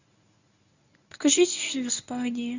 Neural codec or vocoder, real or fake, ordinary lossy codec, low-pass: codec, 24 kHz, 0.9 kbps, WavTokenizer, medium speech release version 1; fake; none; 7.2 kHz